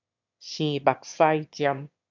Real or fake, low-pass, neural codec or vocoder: fake; 7.2 kHz; autoencoder, 22.05 kHz, a latent of 192 numbers a frame, VITS, trained on one speaker